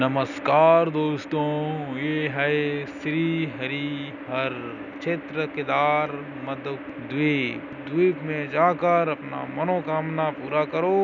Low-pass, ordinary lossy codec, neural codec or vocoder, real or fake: 7.2 kHz; none; none; real